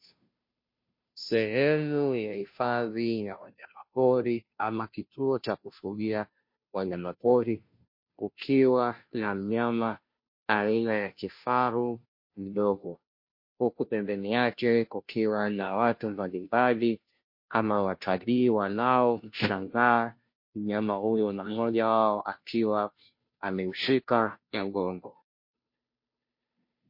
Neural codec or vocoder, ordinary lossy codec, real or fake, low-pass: codec, 16 kHz, 0.5 kbps, FunCodec, trained on Chinese and English, 25 frames a second; MP3, 32 kbps; fake; 5.4 kHz